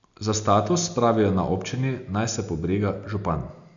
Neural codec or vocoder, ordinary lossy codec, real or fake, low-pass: none; none; real; 7.2 kHz